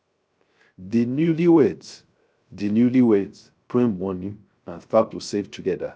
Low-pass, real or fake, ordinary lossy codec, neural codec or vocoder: none; fake; none; codec, 16 kHz, 0.3 kbps, FocalCodec